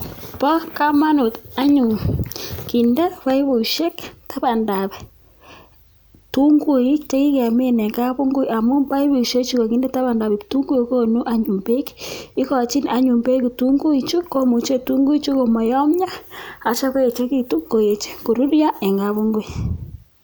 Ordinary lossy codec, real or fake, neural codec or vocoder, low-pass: none; real; none; none